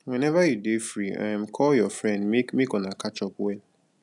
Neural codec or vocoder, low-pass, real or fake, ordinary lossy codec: none; 10.8 kHz; real; none